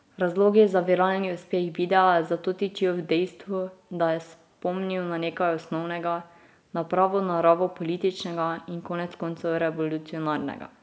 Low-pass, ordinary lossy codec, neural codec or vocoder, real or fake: none; none; none; real